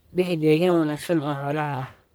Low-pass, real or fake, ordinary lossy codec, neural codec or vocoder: none; fake; none; codec, 44.1 kHz, 1.7 kbps, Pupu-Codec